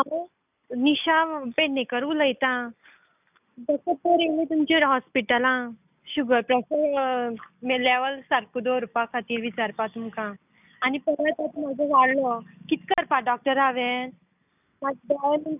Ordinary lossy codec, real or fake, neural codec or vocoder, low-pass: none; real; none; 3.6 kHz